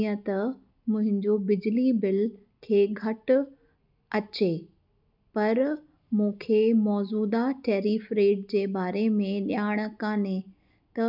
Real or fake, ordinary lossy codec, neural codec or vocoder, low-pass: real; none; none; 5.4 kHz